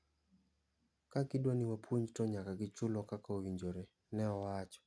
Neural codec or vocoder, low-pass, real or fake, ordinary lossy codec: none; none; real; none